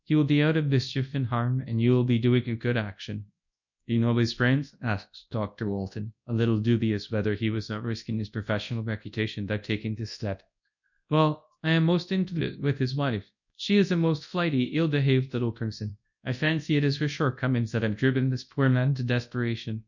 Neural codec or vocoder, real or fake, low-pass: codec, 24 kHz, 0.9 kbps, WavTokenizer, large speech release; fake; 7.2 kHz